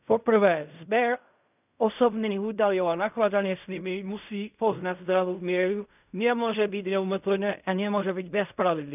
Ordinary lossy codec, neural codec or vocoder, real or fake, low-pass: none; codec, 16 kHz in and 24 kHz out, 0.4 kbps, LongCat-Audio-Codec, fine tuned four codebook decoder; fake; 3.6 kHz